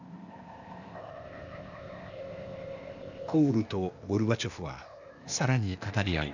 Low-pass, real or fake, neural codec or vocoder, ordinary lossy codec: 7.2 kHz; fake; codec, 16 kHz, 0.8 kbps, ZipCodec; none